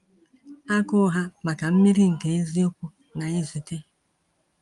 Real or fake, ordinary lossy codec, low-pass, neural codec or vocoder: real; Opus, 32 kbps; 10.8 kHz; none